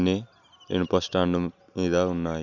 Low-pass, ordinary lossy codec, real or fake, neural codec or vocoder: 7.2 kHz; none; real; none